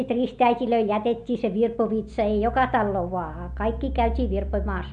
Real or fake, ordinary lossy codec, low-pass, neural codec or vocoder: real; MP3, 64 kbps; 10.8 kHz; none